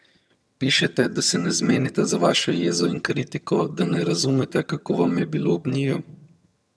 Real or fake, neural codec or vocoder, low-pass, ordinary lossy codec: fake; vocoder, 22.05 kHz, 80 mel bands, HiFi-GAN; none; none